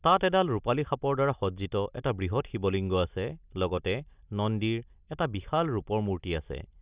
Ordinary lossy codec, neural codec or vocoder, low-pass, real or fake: Opus, 64 kbps; none; 3.6 kHz; real